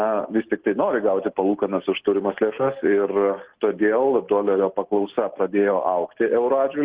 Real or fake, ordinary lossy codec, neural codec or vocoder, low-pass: real; Opus, 16 kbps; none; 3.6 kHz